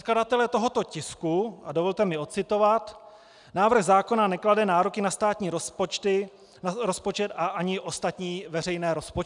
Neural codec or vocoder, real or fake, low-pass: none; real; 10.8 kHz